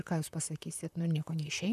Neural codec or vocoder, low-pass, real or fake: vocoder, 44.1 kHz, 128 mel bands, Pupu-Vocoder; 14.4 kHz; fake